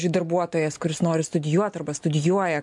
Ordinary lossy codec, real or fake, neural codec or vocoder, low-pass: MP3, 64 kbps; real; none; 10.8 kHz